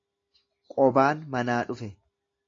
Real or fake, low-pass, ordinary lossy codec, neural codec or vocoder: real; 7.2 kHz; AAC, 32 kbps; none